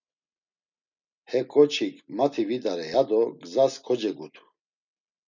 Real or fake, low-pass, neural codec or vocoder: real; 7.2 kHz; none